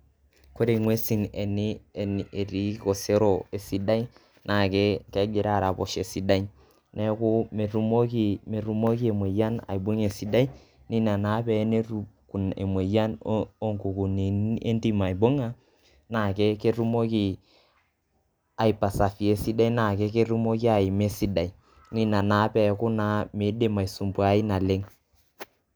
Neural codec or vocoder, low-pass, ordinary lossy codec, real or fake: none; none; none; real